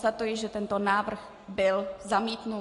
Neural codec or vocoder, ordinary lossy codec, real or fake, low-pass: none; AAC, 48 kbps; real; 10.8 kHz